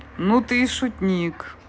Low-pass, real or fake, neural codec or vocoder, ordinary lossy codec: none; real; none; none